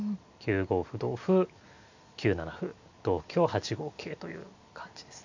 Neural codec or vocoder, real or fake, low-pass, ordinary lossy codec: none; real; 7.2 kHz; AAC, 48 kbps